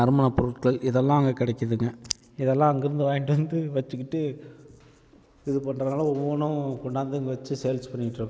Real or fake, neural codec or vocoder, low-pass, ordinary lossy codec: real; none; none; none